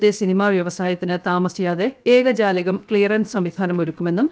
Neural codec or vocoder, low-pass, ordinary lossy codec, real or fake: codec, 16 kHz, 0.7 kbps, FocalCodec; none; none; fake